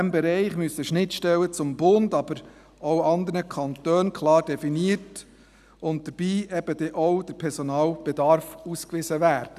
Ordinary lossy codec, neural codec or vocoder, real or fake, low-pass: none; none; real; 14.4 kHz